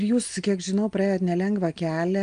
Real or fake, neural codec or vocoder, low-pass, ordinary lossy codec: real; none; 9.9 kHz; Opus, 32 kbps